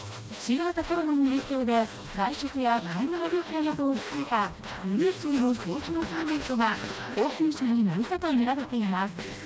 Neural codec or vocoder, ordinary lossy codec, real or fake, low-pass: codec, 16 kHz, 1 kbps, FreqCodec, smaller model; none; fake; none